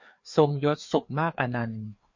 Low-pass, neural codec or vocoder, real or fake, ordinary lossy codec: 7.2 kHz; codec, 16 kHz, 2 kbps, FreqCodec, larger model; fake; MP3, 48 kbps